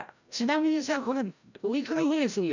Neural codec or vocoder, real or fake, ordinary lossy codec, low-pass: codec, 16 kHz, 0.5 kbps, FreqCodec, larger model; fake; none; 7.2 kHz